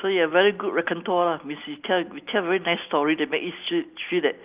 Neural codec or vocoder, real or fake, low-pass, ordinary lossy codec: none; real; 3.6 kHz; Opus, 24 kbps